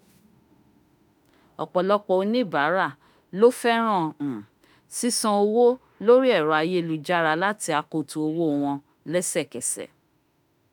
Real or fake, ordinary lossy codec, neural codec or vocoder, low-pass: fake; none; autoencoder, 48 kHz, 32 numbers a frame, DAC-VAE, trained on Japanese speech; none